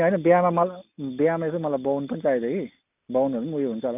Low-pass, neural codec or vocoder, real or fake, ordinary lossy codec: 3.6 kHz; none; real; none